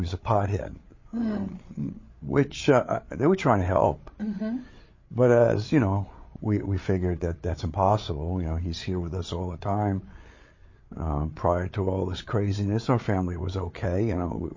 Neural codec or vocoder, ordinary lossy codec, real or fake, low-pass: codec, 16 kHz, 8 kbps, FreqCodec, larger model; MP3, 32 kbps; fake; 7.2 kHz